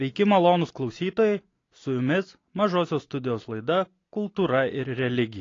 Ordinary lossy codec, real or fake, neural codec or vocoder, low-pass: AAC, 32 kbps; real; none; 7.2 kHz